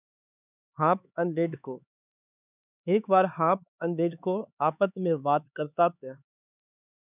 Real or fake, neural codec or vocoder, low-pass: fake; codec, 16 kHz, 4 kbps, X-Codec, HuBERT features, trained on LibriSpeech; 3.6 kHz